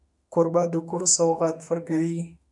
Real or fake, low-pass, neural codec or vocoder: fake; 10.8 kHz; autoencoder, 48 kHz, 32 numbers a frame, DAC-VAE, trained on Japanese speech